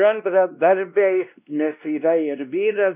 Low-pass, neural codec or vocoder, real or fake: 3.6 kHz; codec, 16 kHz, 1 kbps, X-Codec, WavLM features, trained on Multilingual LibriSpeech; fake